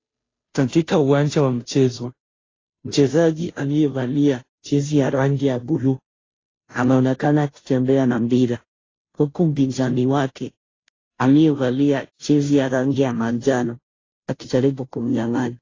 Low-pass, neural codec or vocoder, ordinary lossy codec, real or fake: 7.2 kHz; codec, 16 kHz, 0.5 kbps, FunCodec, trained on Chinese and English, 25 frames a second; AAC, 32 kbps; fake